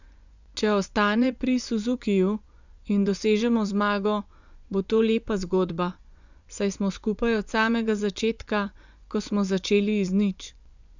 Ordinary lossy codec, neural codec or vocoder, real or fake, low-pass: none; vocoder, 24 kHz, 100 mel bands, Vocos; fake; 7.2 kHz